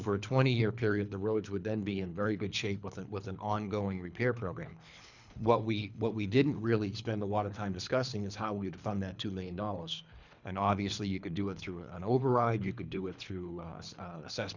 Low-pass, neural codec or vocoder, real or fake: 7.2 kHz; codec, 24 kHz, 3 kbps, HILCodec; fake